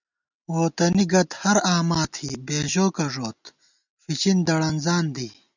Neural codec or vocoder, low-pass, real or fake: none; 7.2 kHz; real